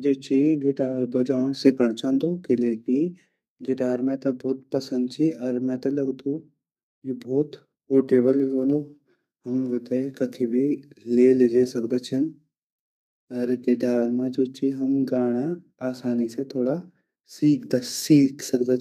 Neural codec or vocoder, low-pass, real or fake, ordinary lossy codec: codec, 32 kHz, 1.9 kbps, SNAC; 14.4 kHz; fake; none